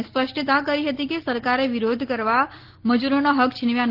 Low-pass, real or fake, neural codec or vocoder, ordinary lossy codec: 5.4 kHz; real; none; Opus, 16 kbps